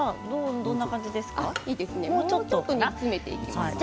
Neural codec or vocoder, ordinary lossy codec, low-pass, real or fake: none; none; none; real